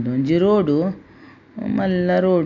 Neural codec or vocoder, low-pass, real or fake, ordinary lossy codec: none; 7.2 kHz; real; none